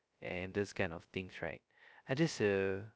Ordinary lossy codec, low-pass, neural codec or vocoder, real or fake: none; none; codec, 16 kHz, 0.2 kbps, FocalCodec; fake